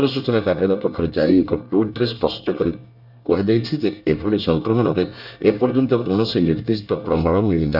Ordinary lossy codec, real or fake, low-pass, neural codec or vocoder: none; fake; 5.4 kHz; codec, 24 kHz, 1 kbps, SNAC